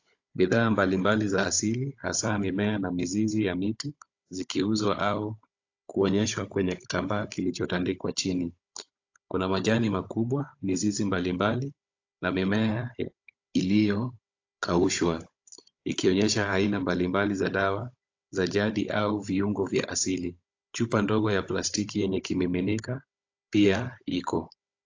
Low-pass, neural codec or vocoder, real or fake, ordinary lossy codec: 7.2 kHz; codec, 16 kHz, 16 kbps, FunCodec, trained on Chinese and English, 50 frames a second; fake; AAC, 48 kbps